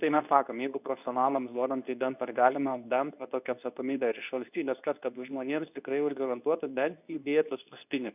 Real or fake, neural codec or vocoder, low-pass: fake; codec, 24 kHz, 0.9 kbps, WavTokenizer, medium speech release version 2; 3.6 kHz